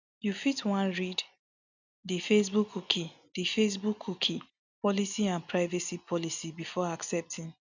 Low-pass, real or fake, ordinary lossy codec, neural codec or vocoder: 7.2 kHz; real; none; none